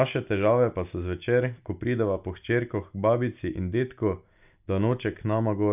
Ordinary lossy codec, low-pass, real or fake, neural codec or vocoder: none; 3.6 kHz; real; none